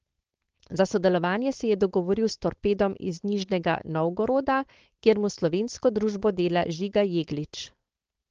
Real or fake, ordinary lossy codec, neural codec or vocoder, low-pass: fake; Opus, 16 kbps; codec, 16 kHz, 4.8 kbps, FACodec; 7.2 kHz